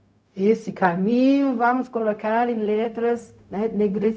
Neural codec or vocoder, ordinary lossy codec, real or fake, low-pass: codec, 16 kHz, 0.4 kbps, LongCat-Audio-Codec; none; fake; none